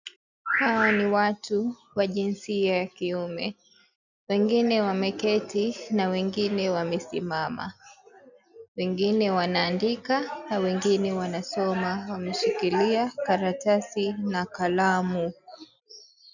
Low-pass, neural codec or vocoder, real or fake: 7.2 kHz; none; real